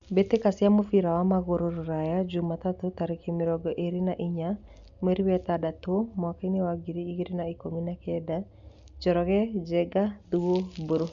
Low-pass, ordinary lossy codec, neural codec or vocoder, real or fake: 7.2 kHz; MP3, 96 kbps; none; real